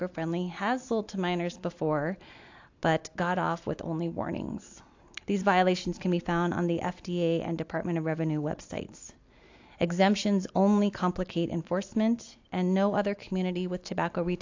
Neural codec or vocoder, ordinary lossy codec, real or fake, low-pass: none; AAC, 48 kbps; real; 7.2 kHz